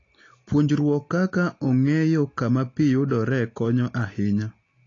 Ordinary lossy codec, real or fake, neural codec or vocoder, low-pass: AAC, 32 kbps; real; none; 7.2 kHz